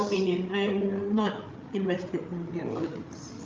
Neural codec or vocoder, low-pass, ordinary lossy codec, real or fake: codec, 16 kHz, 8 kbps, FreqCodec, larger model; 7.2 kHz; Opus, 24 kbps; fake